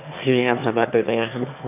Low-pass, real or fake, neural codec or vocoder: 3.6 kHz; fake; autoencoder, 22.05 kHz, a latent of 192 numbers a frame, VITS, trained on one speaker